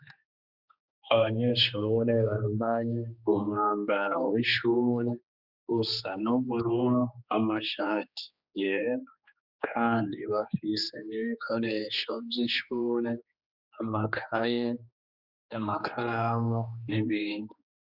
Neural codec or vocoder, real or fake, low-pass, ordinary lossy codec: codec, 16 kHz, 2 kbps, X-Codec, HuBERT features, trained on general audio; fake; 5.4 kHz; Opus, 64 kbps